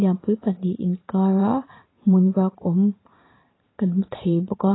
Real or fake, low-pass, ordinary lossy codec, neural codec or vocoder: fake; 7.2 kHz; AAC, 16 kbps; vocoder, 44.1 kHz, 128 mel bands every 512 samples, BigVGAN v2